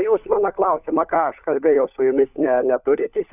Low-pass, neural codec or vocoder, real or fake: 3.6 kHz; codec, 16 kHz, 4 kbps, FunCodec, trained on LibriTTS, 50 frames a second; fake